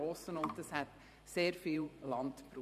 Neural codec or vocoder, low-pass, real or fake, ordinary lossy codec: vocoder, 44.1 kHz, 128 mel bands every 512 samples, BigVGAN v2; 14.4 kHz; fake; MP3, 96 kbps